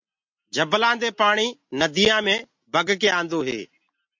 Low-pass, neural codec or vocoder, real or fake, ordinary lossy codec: 7.2 kHz; none; real; MP3, 48 kbps